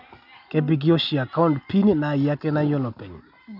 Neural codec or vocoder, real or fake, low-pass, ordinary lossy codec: none; real; 5.4 kHz; none